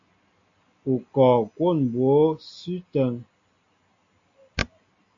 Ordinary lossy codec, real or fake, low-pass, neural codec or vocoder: AAC, 48 kbps; real; 7.2 kHz; none